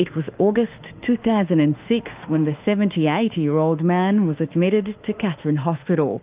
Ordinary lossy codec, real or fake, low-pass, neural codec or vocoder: Opus, 32 kbps; fake; 3.6 kHz; autoencoder, 48 kHz, 32 numbers a frame, DAC-VAE, trained on Japanese speech